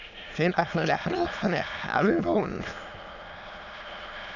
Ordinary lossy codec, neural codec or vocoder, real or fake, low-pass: none; autoencoder, 22.05 kHz, a latent of 192 numbers a frame, VITS, trained on many speakers; fake; 7.2 kHz